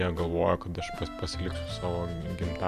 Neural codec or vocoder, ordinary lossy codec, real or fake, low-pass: none; MP3, 96 kbps; real; 14.4 kHz